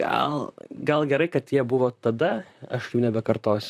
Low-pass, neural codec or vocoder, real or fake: 14.4 kHz; vocoder, 44.1 kHz, 128 mel bands, Pupu-Vocoder; fake